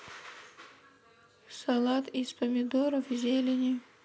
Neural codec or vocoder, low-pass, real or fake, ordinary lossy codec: none; none; real; none